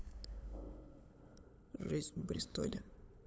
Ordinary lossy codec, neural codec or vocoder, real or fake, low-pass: none; codec, 16 kHz, 8 kbps, FunCodec, trained on LibriTTS, 25 frames a second; fake; none